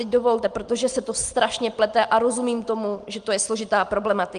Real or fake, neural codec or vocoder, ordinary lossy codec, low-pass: real; none; Opus, 24 kbps; 9.9 kHz